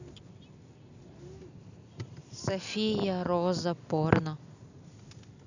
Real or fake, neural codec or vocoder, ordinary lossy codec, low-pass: real; none; none; 7.2 kHz